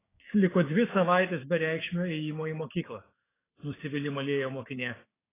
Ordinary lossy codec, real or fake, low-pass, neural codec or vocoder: AAC, 16 kbps; fake; 3.6 kHz; codec, 16 kHz, 6 kbps, DAC